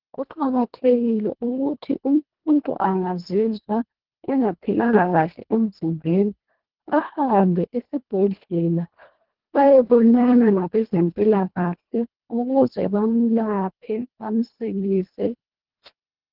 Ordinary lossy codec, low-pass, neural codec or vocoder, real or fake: Opus, 16 kbps; 5.4 kHz; codec, 24 kHz, 1.5 kbps, HILCodec; fake